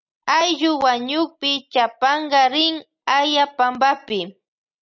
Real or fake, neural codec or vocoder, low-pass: real; none; 7.2 kHz